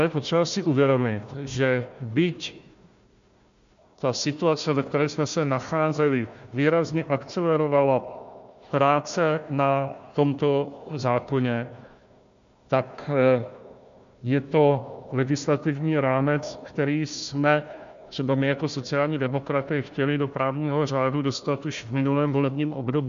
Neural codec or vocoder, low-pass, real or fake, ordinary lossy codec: codec, 16 kHz, 1 kbps, FunCodec, trained on Chinese and English, 50 frames a second; 7.2 kHz; fake; MP3, 64 kbps